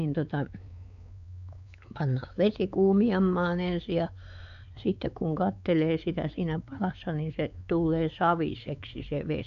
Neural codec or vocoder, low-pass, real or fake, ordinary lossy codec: codec, 16 kHz, 4 kbps, X-Codec, WavLM features, trained on Multilingual LibriSpeech; 7.2 kHz; fake; none